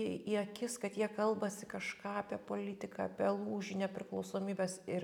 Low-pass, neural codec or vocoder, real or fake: 19.8 kHz; none; real